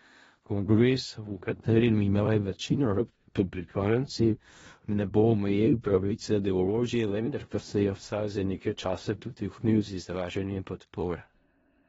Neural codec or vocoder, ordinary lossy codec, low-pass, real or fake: codec, 16 kHz in and 24 kHz out, 0.4 kbps, LongCat-Audio-Codec, four codebook decoder; AAC, 24 kbps; 10.8 kHz; fake